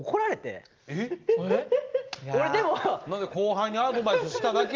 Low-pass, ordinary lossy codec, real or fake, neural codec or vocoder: 7.2 kHz; Opus, 32 kbps; real; none